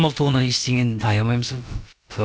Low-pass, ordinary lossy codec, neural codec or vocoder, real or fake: none; none; codec, 16 kHz, about 1 kbps, DyCAST, with the encoder's durations; fake